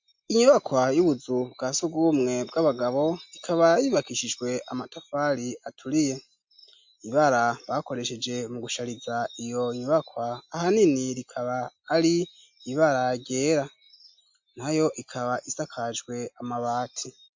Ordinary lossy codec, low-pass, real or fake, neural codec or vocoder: MP3, 48 kbps; 7.2 kHz; real; none